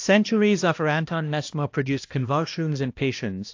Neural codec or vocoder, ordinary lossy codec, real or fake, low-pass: codec, 16 kHz, 1 kbps, X-Codec, HuBERT features, trained on balanced general audio; AAC, 48 kbps; fake; 7.2 kHz